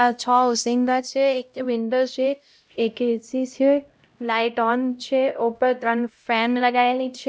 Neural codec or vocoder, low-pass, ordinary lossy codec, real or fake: codec, 16 kHz, 0.5 kbps, X-Codec, HuBERT features, trained on LibriSpeech; none; none; fake